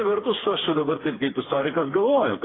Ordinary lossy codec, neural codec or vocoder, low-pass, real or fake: AAC, 16 kbps; codec, 16 kHz, 4 kbps, FreqCodec, smaller model; 7.2 kHz; fake